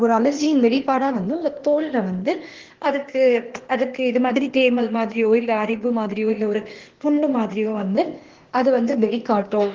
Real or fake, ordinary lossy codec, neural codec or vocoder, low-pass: fake; Opus, 16 kbps; codec, 16 kHz, 0.8 kbps, ZipCodec; 7.2 kHz